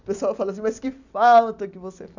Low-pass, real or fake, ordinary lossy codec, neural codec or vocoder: 7.2 kHz; real; none; none